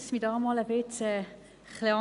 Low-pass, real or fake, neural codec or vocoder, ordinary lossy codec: 10.8 kHz; real; none; none